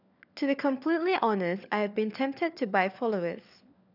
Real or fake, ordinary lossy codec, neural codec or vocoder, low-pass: fake; none; codec, 16 kHz, 4 kbps, FunCodec, trained on LibriTTS, 50 frames a second; 5.4 kHz